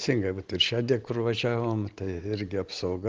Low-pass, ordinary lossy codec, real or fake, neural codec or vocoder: 7.2 kHz; Opus, 16 kbps; real; none